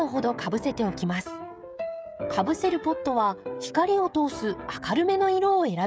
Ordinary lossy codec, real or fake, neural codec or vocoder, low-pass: none; fake; codec, 16 kHz, 16 kbps, FreqCodec, smaller model; none